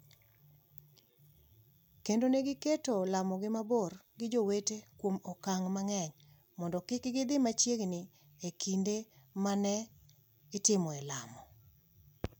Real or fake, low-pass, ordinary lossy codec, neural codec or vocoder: real; none; none; none